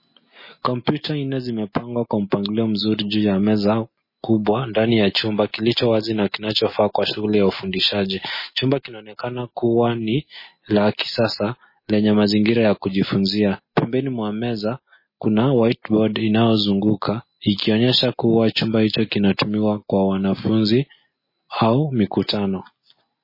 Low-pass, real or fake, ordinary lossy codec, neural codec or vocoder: 5.4 kHz; real; MP3, 24 kbps; none